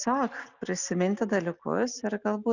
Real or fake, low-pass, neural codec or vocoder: real; 7.2 kHz; none